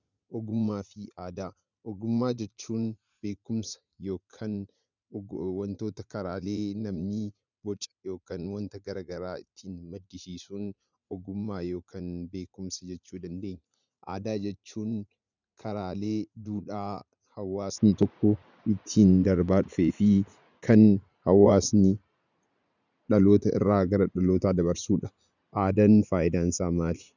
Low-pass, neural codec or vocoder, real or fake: 7.2 kHz; vocoder, 44.1 kHz, 80 mel bands, Vocos; fake